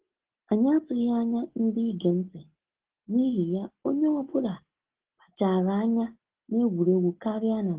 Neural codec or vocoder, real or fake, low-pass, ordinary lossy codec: none; real; 3.6 kHz; Opus, 16 kbps